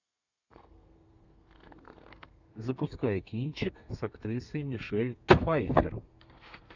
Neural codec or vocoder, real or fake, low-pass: codec, 32 kHz, 1.9 kbps, SNAC; fake; 7.2 kHz